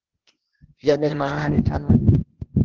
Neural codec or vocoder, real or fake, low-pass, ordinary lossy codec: codec, 16 kHz, 0.8 kbps, ZipCodec; fake; 7.2 kHz; Opus, 16 kbps